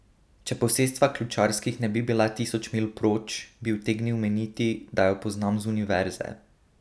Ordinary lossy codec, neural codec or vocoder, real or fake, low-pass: none; none; real; none